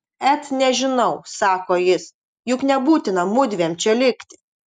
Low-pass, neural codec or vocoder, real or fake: 10.8 kHz; none; real